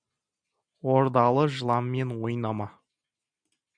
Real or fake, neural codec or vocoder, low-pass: real; none; 9.9 kHz